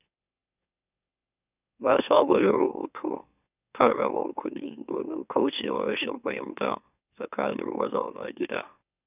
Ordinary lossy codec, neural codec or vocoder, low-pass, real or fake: none; autoencoder, 44.1 kHz, a latent of 192 numbers a frame, MeloTTS; 3.6 kHz; fake